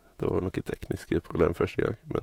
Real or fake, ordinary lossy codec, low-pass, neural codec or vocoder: fake; AAC, 48 kbps; 19.8 kHz; autoencoder, 48 kHz, 128 numbers a frame, DAC-VAE, trained on Japanese speech